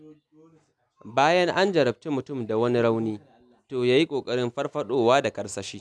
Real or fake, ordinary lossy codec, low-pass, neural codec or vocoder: real; none; none; none